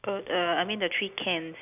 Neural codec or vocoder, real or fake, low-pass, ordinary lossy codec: none; real; 3.6 kHz; none